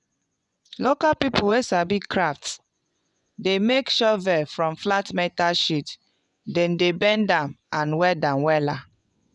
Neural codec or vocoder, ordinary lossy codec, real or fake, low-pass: vocoder, 24 kHz, 100 mel bands, Vocos; none; fake; 10.8 kHz